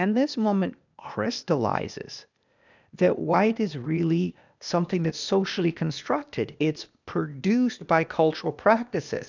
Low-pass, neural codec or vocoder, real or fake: 7.2 kHz; codec, 16 kHz, 0.8 kbps, ZipCodec; fake